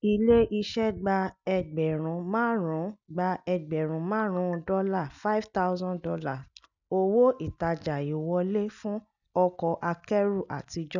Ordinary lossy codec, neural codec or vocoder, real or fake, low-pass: none; none; real; 7.2 kHz